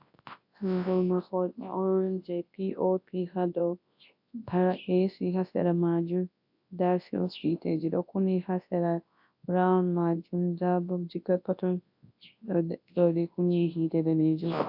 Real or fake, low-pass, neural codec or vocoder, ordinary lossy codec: fake; 5.4 kHz; codec, 24 kHz, 0.9 kbps, WavTokenizer, large speech release; AAC, 48 kbps